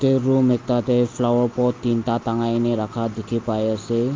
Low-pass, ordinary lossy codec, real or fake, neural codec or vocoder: 7.2 kHz; Opus, 32 kbps; real; none